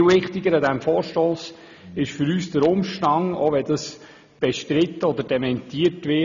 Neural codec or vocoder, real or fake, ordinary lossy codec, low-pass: none; real; none; 7.2 kHz